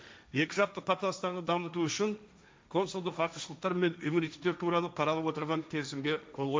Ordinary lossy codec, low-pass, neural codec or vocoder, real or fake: none; none; codec, 16 kHz, 1.1 kbps, Voila-Tokenizer; fake